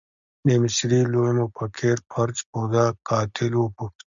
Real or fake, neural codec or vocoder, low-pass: real; none; 7.2 kHz